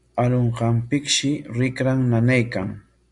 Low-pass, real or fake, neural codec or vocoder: 10.8 kHz; real; none